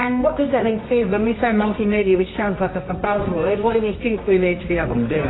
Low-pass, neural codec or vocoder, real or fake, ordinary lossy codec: 7.2 kHz; codec, 24 kHz, 0.9 kbps, WavTokenizer, medium music audio release; fake; AAC, 16 kbps